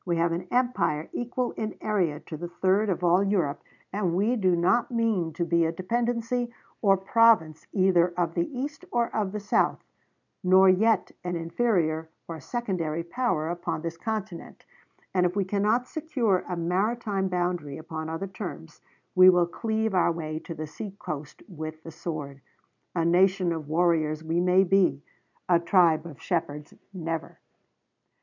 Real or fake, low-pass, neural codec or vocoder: real; 7.2 kHz; none